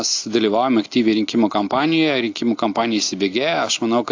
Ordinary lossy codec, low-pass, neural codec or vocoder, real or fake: AAC, 48 kbps; 7.2 kHz; none; real